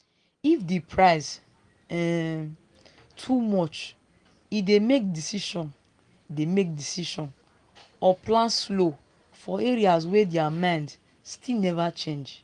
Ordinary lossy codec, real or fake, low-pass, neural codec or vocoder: Opus, 24 kbps; real; 9.9 kHz; none